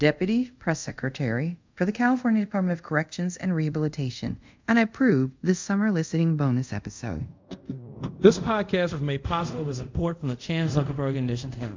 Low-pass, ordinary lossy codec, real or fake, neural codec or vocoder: 7.2 kHz; MP3, 64 kbps; fake; codec, 24 kHz, 0.5 kbps, DualCodec